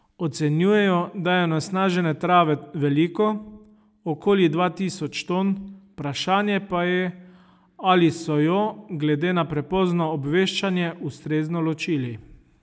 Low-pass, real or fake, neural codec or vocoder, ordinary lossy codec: none; real; none; none